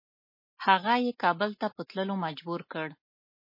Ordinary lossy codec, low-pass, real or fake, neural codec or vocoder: MP3, 24 kbps; 5.4 kHz; real; none